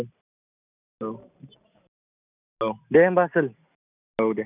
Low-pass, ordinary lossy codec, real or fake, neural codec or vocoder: 3.6 kHz; none; real; none